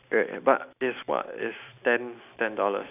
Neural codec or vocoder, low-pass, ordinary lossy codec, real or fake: none; 3.6 kHz; none; real